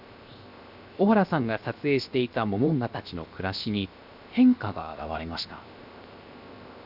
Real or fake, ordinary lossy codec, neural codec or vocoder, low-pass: fake; Opus, 64 kbps; codec, 16 kHz, 0.7 kbps, FocalCodec; 5.4 kHz